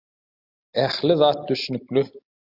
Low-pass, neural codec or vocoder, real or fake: 5.4 kHz; none; real